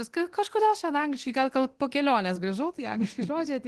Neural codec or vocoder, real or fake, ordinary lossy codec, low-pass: codec, 24 kHz, 0.9 kbps, DualCodec; fake; Opus, 16 kbps; 10.8 kHz